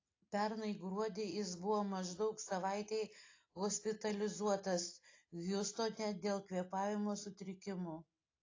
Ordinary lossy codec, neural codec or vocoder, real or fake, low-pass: AAC, 32 kbps; none; real; 7.2 kHz